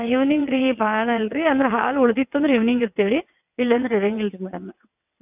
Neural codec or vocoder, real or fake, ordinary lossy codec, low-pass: vocoder, 22.05 kHz, 80 mel bands, WaveNeXt; fake; AAC, 32 kbps; 3.6 kHz